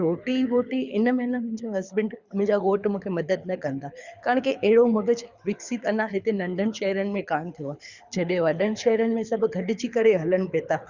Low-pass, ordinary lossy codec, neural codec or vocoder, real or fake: 7.2 kHz; Opus, 64 kbps; codec, 24 kHz, 6 kbps, HILCodec; fake